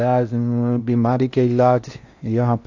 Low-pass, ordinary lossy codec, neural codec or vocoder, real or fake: 7.2 kHz; AAC, 48 kbps; codec, 16 kHz in and 24 kHz out, 0.6 kbps, FocalCodec, streaming, 4096 codes; fake